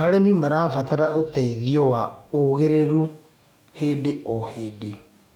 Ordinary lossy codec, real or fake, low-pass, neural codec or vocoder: none; fake; 19.8 kHz; codec, 44.1 kHz, 2.6 kbps, DAC